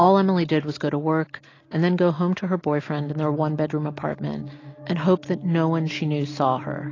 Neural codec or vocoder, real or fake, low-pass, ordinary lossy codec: none; real; 7.2 kHz; AAC, 32 kbps